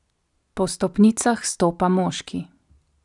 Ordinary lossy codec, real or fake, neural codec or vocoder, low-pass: none; real; none; 10.8 kHz